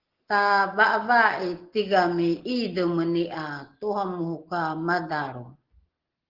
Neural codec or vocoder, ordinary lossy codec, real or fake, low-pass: none; Opus, 16 kbps; real; 5.4 kHz